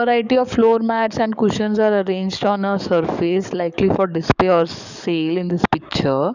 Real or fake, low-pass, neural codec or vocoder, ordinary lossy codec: fake; 7.2 kHz; codec, 44.1 kHz, 7.8 kbps, DAC; none